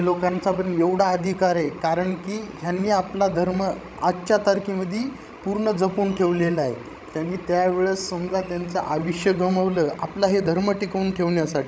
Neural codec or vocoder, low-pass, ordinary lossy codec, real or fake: codec, 16 kHz, 16 kbps, FreqCodec, larger model; none; none; fake